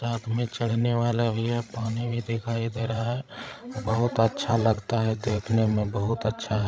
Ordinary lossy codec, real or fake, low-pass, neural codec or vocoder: none; fake; none; codec, 16 kHz, 16 kbps, FreqCodec, larger model